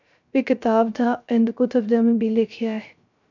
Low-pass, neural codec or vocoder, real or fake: 7.2 kHz; codec, 16 kHz, 0.3 kbps, FocalCodec; fake